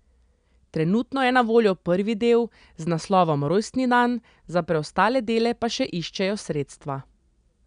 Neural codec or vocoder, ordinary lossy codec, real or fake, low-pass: none; none; real; 9.9 kHz